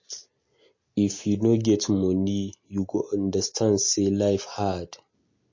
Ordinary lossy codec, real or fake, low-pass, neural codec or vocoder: MP3, 32 kbps; real; 7.2 kHz; none